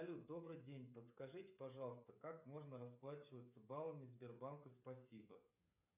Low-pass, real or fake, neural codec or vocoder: 3.6 kHz; fake; codec, 16 kHz, 8 kbps, FreqCodec, smaller model